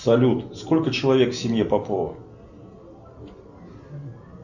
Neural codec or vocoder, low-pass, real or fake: none; 7.2 kHz; real